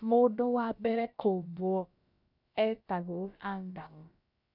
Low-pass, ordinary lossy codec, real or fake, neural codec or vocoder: 5.4 kHz; none; fake; codec, 16 kHz, about 1 kbps, DyCAST, with the encoder's durations